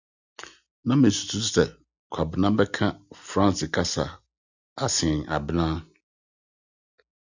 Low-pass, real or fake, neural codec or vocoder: 7.2 kHz; real; none